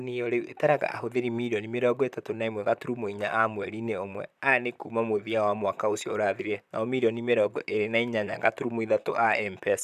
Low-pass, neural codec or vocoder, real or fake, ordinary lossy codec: 14.4 kHz; vocoder, 44.1 kHz, 128 mel bands, Pupu-Vocoder; fake; none